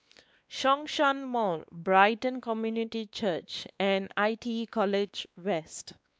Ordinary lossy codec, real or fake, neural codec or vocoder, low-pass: none; fake; codec, 16 kHz, 2 kbps, X-Codec, WavLM features, trained on Multilingual LibriSpeech; none